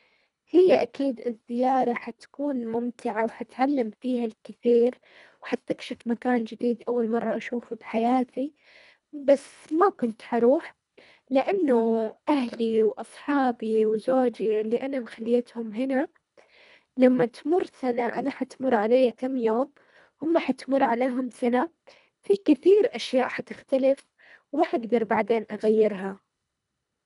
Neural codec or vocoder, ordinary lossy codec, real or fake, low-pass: codec, 24 kHz, 1.5 kbps, HILCodec; none; fake; 10.8 kHz